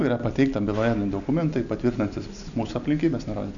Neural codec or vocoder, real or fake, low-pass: none; real; 7.2 kHz